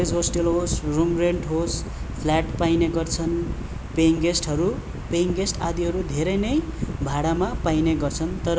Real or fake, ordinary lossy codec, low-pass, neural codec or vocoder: real; none; none; none